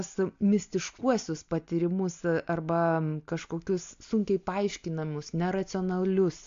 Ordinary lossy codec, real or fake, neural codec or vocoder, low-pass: AAC, 48 kbps; real; none; 7.2 kHz